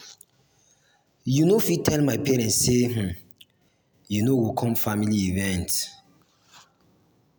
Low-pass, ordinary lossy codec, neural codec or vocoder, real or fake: none; none; none; real